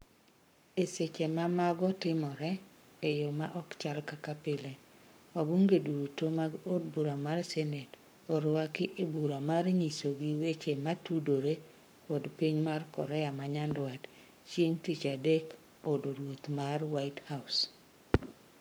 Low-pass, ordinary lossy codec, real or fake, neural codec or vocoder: none; none; fake; codec, 44.1 kHz, 7.8 kbps, Pupu-Codec